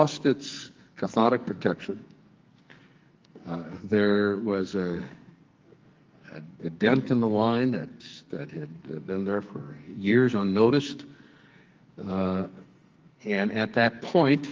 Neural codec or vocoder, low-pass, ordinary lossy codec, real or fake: codec, 44.1 kHz, 2.6 kbps, SNAC; 7.2 kHz; Opus, 32 kbps; fake